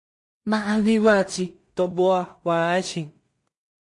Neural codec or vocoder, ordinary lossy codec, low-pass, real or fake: codec, 16 kHz in and 24 kHz out, 0.4 kbps, LongCat-Audio-Codec, two codebook decoder; MP3, 48 kbps; 10.8 kHz; fake